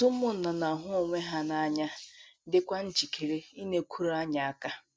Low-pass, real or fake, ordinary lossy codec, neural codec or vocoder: none; real; none; none